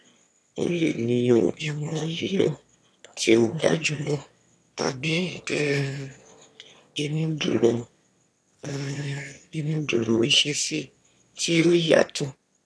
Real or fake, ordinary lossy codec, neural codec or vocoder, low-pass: fake; none; autoencoder, 22.05 kHz, a latent of 192 numbers a frame, VITS, trained on one speaker; none